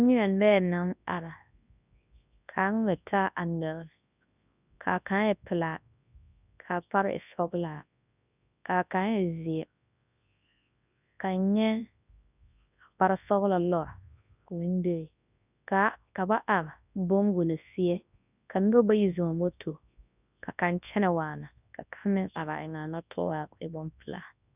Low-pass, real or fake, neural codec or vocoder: 3.6 kHz; fake; codec, 24 kHz, 0.9 kbps, WavTokenizer, large speech release